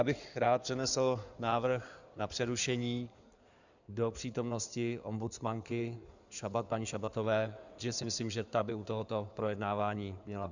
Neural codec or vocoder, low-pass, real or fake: codec, 16 kHz in and 24 kHz out, 2.2 kbps, FireRedTTS-2 codec; 7.2 kHz; fake